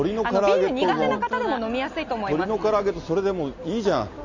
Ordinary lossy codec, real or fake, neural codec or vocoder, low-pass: none; real; none; 7.2 kHz